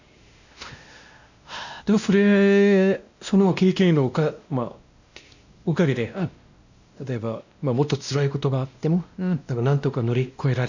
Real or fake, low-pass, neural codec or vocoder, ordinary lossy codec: fake; 7.2 kHz; codec, 16 kHz, 1 kbps, X-Codec, WavLM features, trained on Multilingual LibriSpeech; none